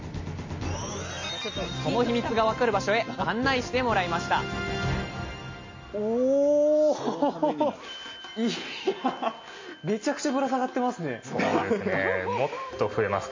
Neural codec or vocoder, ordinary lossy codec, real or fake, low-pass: none; MP3, 32 kbps; real; 7.2 kHz